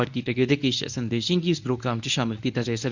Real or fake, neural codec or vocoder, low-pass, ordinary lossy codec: fake; codec, 24 kHz, 0.9 kbps, WavTokenizer, medium speech release version 1; 7.2 kHz; none